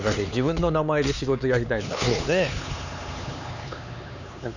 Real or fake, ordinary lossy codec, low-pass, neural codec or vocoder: fake; none; 7.2 kHz; codec, 16 kHz, 4 kbps, X-Codec, HuBERT features, trained on LibriSpeech